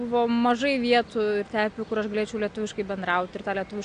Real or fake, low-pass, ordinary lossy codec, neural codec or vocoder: real; 9.9 kHz; AAC, 64 kbps; none